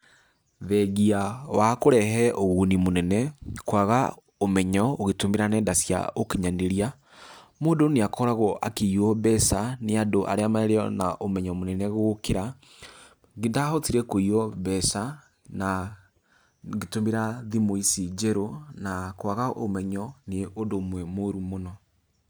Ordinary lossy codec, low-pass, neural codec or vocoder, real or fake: none; none; none; real